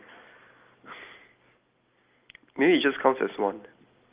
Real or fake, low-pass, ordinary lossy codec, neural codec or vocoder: real; 3.6 kHz; Opus, 24 kbps; none